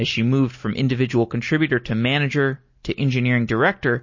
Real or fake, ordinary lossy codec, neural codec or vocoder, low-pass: real; MP3, 32 kbps; none; 7.2 kHz